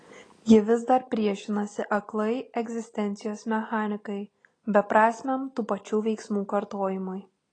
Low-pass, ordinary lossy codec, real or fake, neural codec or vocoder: 9.9 kHz; AAC, 32 kbps; real; none